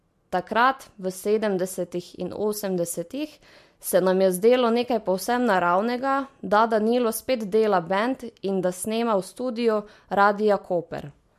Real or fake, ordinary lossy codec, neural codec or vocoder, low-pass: real; MP3, 64 kbps; none; 14.4 kHz